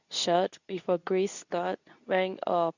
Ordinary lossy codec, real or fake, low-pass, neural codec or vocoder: none; fake; 7.2 kHz; codec, 24 kHz, 0.9 kbps, WavTokenizer, medium speech release version 2